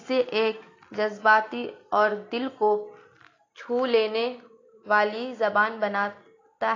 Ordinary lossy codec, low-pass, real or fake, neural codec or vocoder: AAC, 32 kbps; 7.2 kHz; real; none